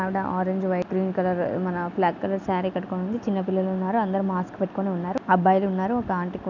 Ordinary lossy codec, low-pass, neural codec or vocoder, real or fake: none; 7.2 kHz; none; real